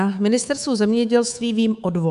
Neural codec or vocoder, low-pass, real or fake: codec, 24 kHz, 3.1 kbps, DualCodec; 10.8 kHz; fake